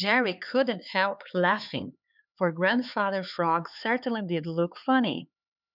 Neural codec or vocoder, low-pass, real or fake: codec, 16 kHz, 4 kbps, X-Codec, HuBERT features, trained on LibriSpeech; 5.4 kHz; fake